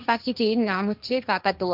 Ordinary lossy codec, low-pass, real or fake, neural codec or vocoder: none; 5.4 kHz; fake; codec, 16 kHz, 1.1 kbps, Voila-Tokenizer